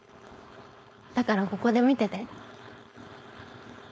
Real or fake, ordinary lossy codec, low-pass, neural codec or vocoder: fake; none; none; codec, 16 kHz, 4.8 kbps, FACodec